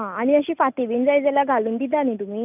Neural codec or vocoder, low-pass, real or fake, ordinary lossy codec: none; 3.6 kHz; real; none